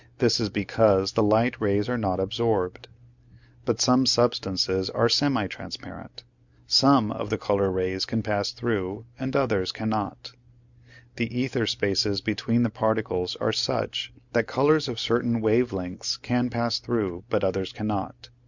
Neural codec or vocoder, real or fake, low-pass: none; real; 7.2 kHz